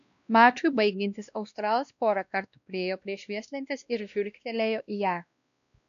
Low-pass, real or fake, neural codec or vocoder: 7.2 kHz; fake; codec, 16 kHz, 1 kbps, X-Codec, WavLM features, trained on Multilingual LibriSpeech